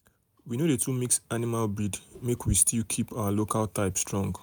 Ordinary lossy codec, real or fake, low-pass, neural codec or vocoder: none; real; none; none